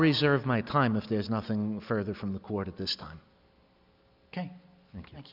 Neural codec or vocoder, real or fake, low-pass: none; real; 5.4 kHz